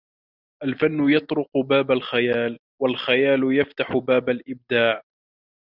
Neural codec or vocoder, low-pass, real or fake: none; 5.4 kHz; real